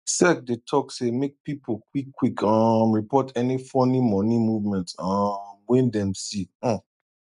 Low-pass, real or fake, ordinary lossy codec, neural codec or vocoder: 10.8 kHz; real; none; none